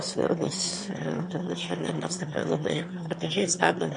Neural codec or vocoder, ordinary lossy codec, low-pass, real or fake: autoencoder, 22.05 kHz, a latent of 192 numbers a frame, VITS, trained on one speaker; MP3, 48 kbps; 9.9 kHz; fake